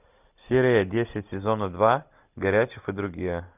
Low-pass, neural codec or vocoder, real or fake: 3.6 kHz; none; real